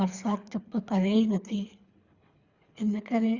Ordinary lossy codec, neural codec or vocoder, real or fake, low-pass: Opus, 64 kbps; codec, 24 kHz, 3 kbps, HILCodec; fake; 7.2 kHz